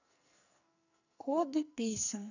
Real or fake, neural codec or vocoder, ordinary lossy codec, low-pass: fake; codec, 44.1 kHz, 2.6 kbps, SNAC; none; 7.2 kHz